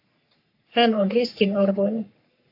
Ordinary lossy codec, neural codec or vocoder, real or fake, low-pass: AAC, 48 kbps; codec, 44.1 kHz, 3.4 kbps, Pupu-Codec; fake; 5.4 kHz